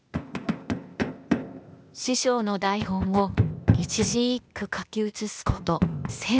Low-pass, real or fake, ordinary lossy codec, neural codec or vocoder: none; fake; none; codec, 16 kHz, 0.8 kbps, ZipCodec